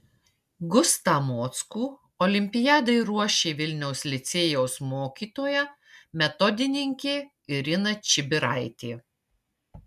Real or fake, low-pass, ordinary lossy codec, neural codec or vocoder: real; 14.4 kHz; MP3, 96 kbps; none